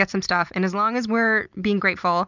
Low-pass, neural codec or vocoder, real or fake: 7.2 kHz; none; real